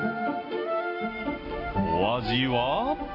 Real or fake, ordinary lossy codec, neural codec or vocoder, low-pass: real; AAC, 24 kbps; none; 5.4 kHz